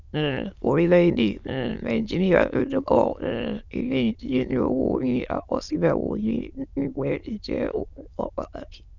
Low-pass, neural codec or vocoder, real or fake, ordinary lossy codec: 7.2 kHz; autoencoder, 22.05 kHz, a latent of 192 numbers a frame, VITS, trained on many speakers; fake; none